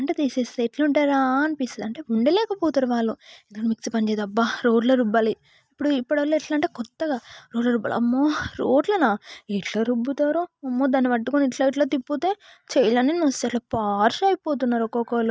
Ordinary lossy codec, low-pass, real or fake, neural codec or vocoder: none; none; real; none